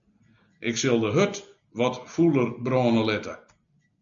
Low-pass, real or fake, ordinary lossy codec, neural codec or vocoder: 7.2 kHz; real; AAC, 64 kbps; none